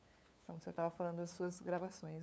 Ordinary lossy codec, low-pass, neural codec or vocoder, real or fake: none; none; codec, 16 kHz, 8 kbps, FunCodec, trained on LibriTTS, 25 frames a second; fake